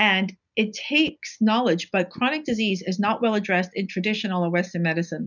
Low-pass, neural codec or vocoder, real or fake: 7.2 kHz; none; real